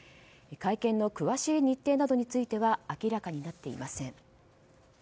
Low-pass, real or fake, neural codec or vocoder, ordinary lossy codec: none; real; none; none